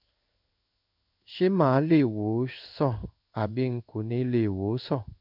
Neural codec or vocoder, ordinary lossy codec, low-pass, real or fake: codec, 16 kHz in and 24 kHz out, 1 kbps, XY-Tokenizer; none; 5.4 kHz; fake